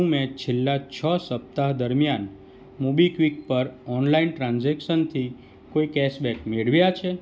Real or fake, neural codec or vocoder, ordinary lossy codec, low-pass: real; none; none; none